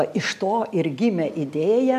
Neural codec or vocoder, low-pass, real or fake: none; 14.4 kHz; real